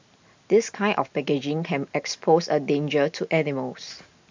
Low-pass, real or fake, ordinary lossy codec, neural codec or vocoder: 7.2 kHz; real; MP3, 64 kbps; none